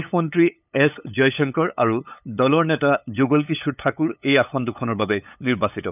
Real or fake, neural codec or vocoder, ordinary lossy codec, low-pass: fake; codec, 16 kHz, 8 kbps, FunCodec, trained on LibriTTS, 25 frames a second; none; 3.6 kHz